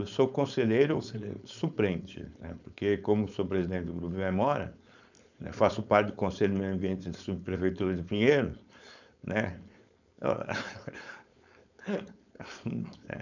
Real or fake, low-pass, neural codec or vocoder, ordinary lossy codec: fake; 7.2 kHz; codec, 16 kHz, 4.8 kbps, FACodec; none